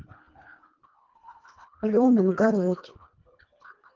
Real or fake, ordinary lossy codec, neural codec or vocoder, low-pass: fake; Opus, 24 kbps; codec, 24 kHz, 1.5 kbps, HILCodec; 7.2 kHz